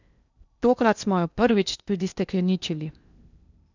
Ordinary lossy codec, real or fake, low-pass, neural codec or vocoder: none; fake; 7.2 kHz; codec, 16 kHz in and 24 kHz out, 0.8 kbps, FocalCodec, streaming, 65536 codes